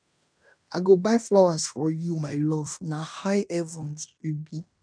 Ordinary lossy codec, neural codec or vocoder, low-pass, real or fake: none; codec, 16 kHz in and 24 kHz out, 0.9 kbps, LongCat-Audio-Codec, fine tuned four codebook decoder; 9.9 kHz; fake